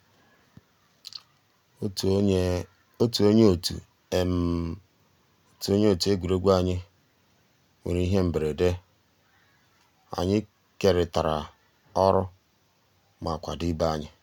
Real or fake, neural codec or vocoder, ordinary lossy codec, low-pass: real; none; none; 19.8 kHz